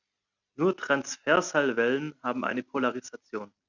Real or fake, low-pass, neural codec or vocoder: real; 7.2 kHz; none